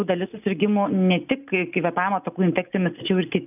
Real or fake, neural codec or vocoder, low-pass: real; none; 3.6 kHz